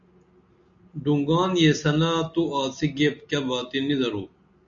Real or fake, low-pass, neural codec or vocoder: real; 7.2 kHz; none